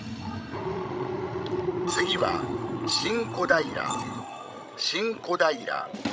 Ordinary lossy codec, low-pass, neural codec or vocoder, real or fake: none; none; codec, 16 kHz, 16 kbps, FreqCodec, larger model; fake